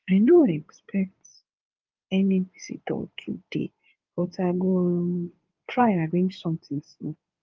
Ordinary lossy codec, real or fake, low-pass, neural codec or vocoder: Opus, 32 kbps; fake; 7.2 kHz; codec, 16 kHz in and 24 kHz out, 2.2 kbps, FireRedTTS-2 codec